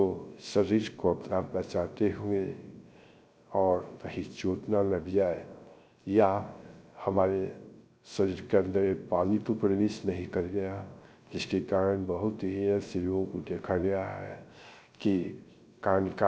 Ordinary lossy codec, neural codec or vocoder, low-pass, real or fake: none; codec, 16 kHz, 0.3 kbps, FocalCodec; none; fake